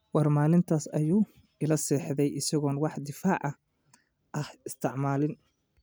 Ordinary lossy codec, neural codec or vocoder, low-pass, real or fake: none; none; none; real